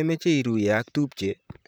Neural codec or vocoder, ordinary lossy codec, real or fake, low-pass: vocoder, 44.1 kHz, 128 mel bands, Pupu-Vocoder; none; fake; none